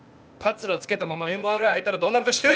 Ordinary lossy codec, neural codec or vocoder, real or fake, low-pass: none; codec, 16 kHz, 0.8 kbps, ZipCodec; fake; none